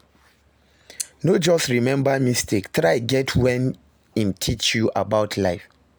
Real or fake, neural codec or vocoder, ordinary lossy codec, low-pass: real; none; none; none